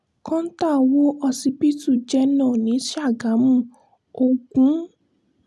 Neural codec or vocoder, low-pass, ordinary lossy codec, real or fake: none; none; none; real